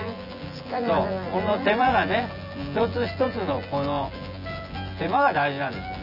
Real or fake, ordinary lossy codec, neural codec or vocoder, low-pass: fake; none; vocoder, 24 kHz, 100 mel bands, Vocos; 5.4 kHz